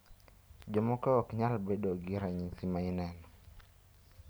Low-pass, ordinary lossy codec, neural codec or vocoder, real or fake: none; none; none; real